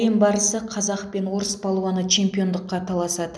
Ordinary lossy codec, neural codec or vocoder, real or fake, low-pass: none; none; real; none